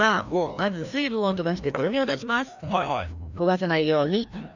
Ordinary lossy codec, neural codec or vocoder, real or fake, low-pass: none; codec, 16 kHz, 1 kbps, FreqCodec, larger model; fake; 7.2 kHz